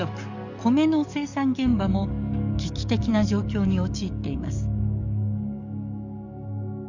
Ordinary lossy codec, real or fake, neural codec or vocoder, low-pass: none; fake; codec, 44.1 kHz, 7.8 kbps, DAC; 7.2 kHz